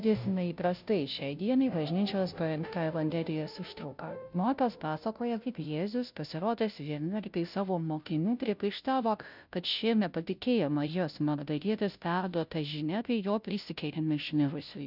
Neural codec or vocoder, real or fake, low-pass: codec, 16 kHz, 0.5 kbps, FunCodec, trained on Chinese and English, 25 frames a second; fake; 5.4 kHz